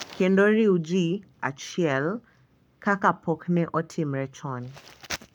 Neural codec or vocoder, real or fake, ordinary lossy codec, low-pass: codec, 44.1 kHz, 7.8 kbps, Pupu-Codec; fake; none; 19.8 kHz